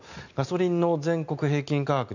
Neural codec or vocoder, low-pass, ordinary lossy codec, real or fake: none; 7.2 kHz; none; real